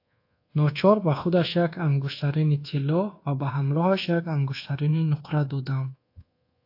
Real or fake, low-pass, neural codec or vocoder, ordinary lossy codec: fake; 5.4 kHz; codec, 24 kHz, 1.2 kbps, DualCodec; AAC, 32 kbps